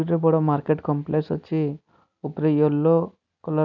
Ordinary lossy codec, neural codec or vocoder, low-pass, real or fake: none; none; 7.2 kHz; real